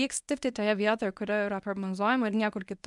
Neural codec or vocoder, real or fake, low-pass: codec, 24 kHz, 0.9 kbps, WavTokenizer, medium speech release version 1; fake; 10.8 kHz